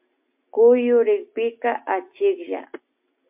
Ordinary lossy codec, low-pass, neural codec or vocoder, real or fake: MP3, 32 kbps; 3.6 kHz; none; real